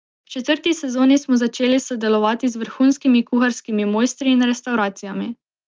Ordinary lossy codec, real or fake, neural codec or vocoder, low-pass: Opus, 24 kbps; real; none; 7.2 kHz